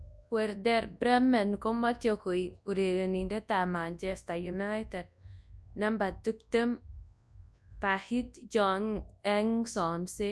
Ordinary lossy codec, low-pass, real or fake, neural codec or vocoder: none; none; fake; codec, 24 kHz, 0.9 kbps, WavTokenizer, large speech release